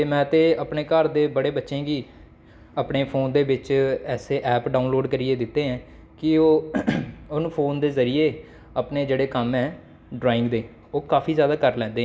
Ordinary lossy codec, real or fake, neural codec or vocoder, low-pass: none; real; none; none